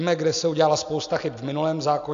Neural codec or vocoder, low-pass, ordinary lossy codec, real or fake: none; 7.2 kHz; AAC, 64 kbps; real